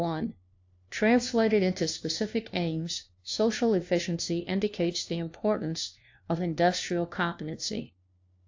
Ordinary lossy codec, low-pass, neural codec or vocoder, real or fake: AAC, 48 kbps; 7.2 kHz; codec, 16 kHz, 1 kbps, FunCodec, trained on LibriTTS, 50 frames a second; fake